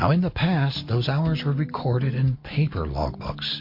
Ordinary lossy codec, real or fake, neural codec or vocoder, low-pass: MP3, 32 kbps; real; none; 5.4 kHz